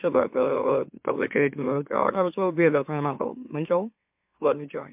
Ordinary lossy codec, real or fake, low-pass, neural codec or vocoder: MP3, 32 kbps; fake; 3.6 kHz; autoencoder, 44.1 kHz, a latent of 192 numbers a frame, MeloTTS